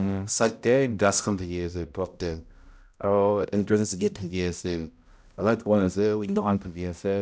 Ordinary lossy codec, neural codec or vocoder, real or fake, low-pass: none; codec, 16 kHz, 0.5 kbps, X-Codec, HuBERT features, trained on balanced general audio; fake; none